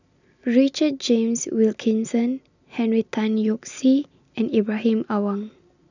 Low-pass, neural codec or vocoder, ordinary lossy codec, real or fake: 7.2 kHz; none; none; real